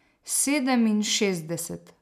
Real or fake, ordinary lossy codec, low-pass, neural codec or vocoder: real; none; 14.4 kHz; none